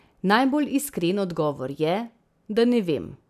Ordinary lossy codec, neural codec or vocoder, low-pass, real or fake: none; none; 14.4 kHz; real